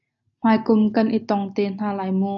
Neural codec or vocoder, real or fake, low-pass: none; real; 7.2 kHz